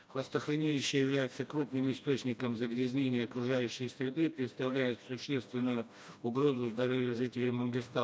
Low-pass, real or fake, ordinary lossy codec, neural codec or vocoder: none; fake; none; codec, 16 kHz, 1 kbps, FreqCodec, smaller model